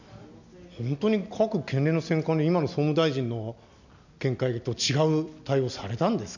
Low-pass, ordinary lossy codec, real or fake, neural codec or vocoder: 7.2 kHz; none; real; none